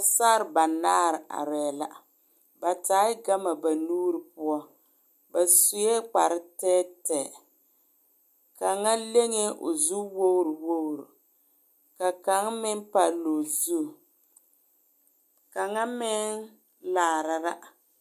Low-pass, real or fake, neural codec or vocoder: 14.4 kHz; real; none